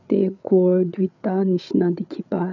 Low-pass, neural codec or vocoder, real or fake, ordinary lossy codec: 7.2 kHz; codec, 16 kHz, 8 kbps, FreqCodec, larger model; fake; none